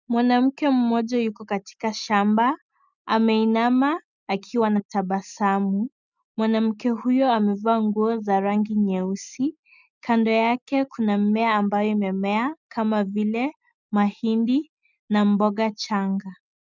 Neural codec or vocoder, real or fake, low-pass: none; real; 7.2 kHz